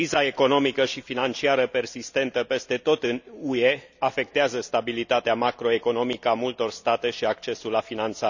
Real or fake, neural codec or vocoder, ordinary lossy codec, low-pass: real; none; none; 7.2 kHz